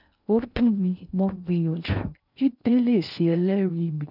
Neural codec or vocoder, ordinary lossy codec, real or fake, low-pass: codec, 16 kHz in and 24 kHz out, 0.6 kbps, FocalCodec, streaming, 2048 codes; none; fake; 5.4 kHz